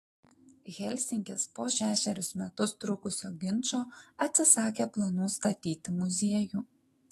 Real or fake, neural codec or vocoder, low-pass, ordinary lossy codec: fake; autoencoder, 48 kHz, 128 numbers a frame, DAC-VAE, trained on Japanese speech; 19.8 kHz; AAC, 32 kbps